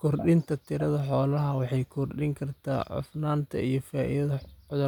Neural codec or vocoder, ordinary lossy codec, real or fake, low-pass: none; none; real; 19.8 kHz